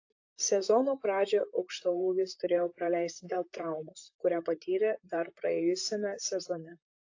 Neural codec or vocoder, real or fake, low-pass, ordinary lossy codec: vocoder, 44.1 kHz, 128 mel bands, Pupu-Vocoder; fake; 7.2 kHz; AAC, 48 kbps